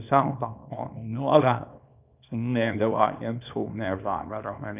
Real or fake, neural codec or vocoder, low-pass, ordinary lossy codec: fake; codec, 24 kHz, 0.9 kbps, WavTokenizer, small release; 3.6 kHz; none